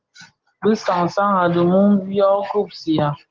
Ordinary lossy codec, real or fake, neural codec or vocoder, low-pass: Opus, 16 kbps; real; none; 7.2 kHz